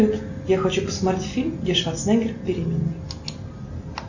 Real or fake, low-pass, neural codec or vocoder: real; 7.2 kHz; none